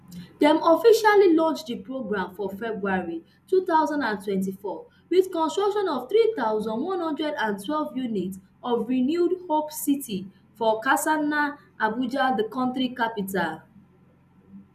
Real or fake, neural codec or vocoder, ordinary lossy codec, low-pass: real; none; none; 14.4 kHz